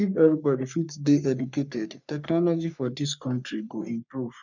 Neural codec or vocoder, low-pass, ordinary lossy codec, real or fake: codec, 44.1 kHz, 3.4 kbps, Pupu-Codec; 7.2 kHz; none; fake